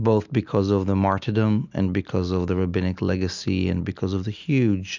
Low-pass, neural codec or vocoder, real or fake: 7.2 kHz; none; real